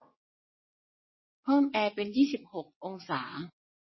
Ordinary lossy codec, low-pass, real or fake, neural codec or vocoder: MP3, 24 kbps; 7.2 kHz; fake; vocoder, 22.05 kHz, 80 mel bands, WaveNeXt